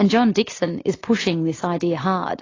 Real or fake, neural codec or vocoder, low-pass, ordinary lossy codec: real; none; 7.2 kHz; AAC, 32 kbps